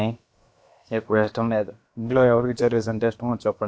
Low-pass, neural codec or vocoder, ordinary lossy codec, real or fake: none; codec, 16 kHz, about 1 kbps, DyCAST, with the encoder's durations; none; fake